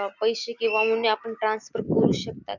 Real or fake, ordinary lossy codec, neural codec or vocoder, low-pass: real; none; none; 7.2 kHz